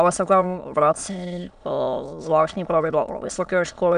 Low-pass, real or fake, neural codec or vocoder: 9.9 kHz; fake; autoencoder, 22.05 kHz, a latent of 192 numbers a frame, VITS, trained on many speakers